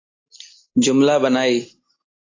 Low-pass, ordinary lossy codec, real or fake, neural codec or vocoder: 7.2 kHz; AAC, 32 kbps; real; none